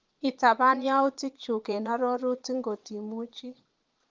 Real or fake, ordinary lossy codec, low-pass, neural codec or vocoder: fake; Opus, 32 kbps; 7.2 kHz; vocoder, 22.05 kHz, 80 mel bands, Vocos